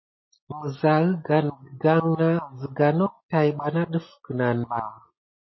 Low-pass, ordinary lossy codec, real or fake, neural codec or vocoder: 7.2 kHz; MP3, 24 kbps; real; none